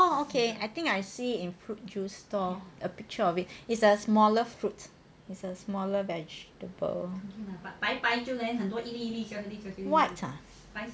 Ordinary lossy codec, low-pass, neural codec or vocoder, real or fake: none; none; none; real